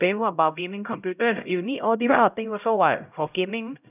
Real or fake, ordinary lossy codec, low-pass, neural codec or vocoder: fake; none; 3.6 kHz; codec, 16 kHz, 0.5 kbps, X-Codec, HuBERT features, trained on LibriSpeech